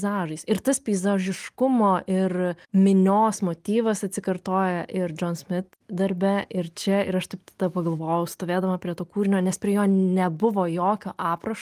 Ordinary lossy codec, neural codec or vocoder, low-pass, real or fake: Opus, 32 kbps; none; 14.4 kHz; real